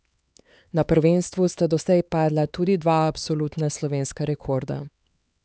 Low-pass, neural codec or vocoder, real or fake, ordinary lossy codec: none; codec, 16 kHz, 4 kbps, X-Codec, HuBERT features, trained on LibriSpeech; fake; none